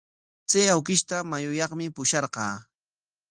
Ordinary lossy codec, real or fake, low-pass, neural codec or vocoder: Opus, 24 kbps; real; 9.9 kHz; none